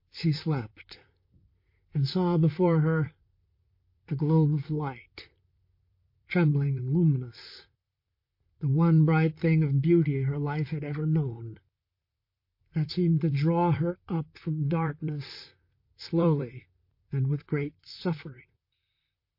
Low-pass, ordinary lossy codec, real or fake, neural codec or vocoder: 5.4 kHz; MP3, 32 kbps; fake; vocoder, 44.1 kHz, 128 mel bands, Pupu-Vocoder